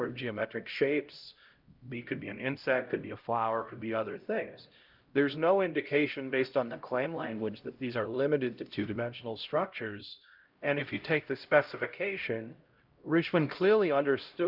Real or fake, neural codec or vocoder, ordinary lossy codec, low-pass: fake; codec, 16 kHz, 0.5 kbps, X-Codec, HuBERT features, trained on LibriSpeech; Opus, 24 kbps; 5.4 kHz